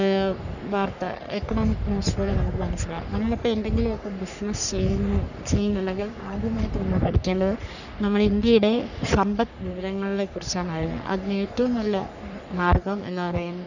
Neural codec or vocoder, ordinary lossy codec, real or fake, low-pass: codec, 44.1 kHz, 3.4 kbps, Pupu-Codec; none; fake; 7.2 kHz